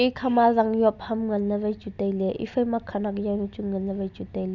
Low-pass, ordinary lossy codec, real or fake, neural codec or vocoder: 7.2 kHz; none; real; none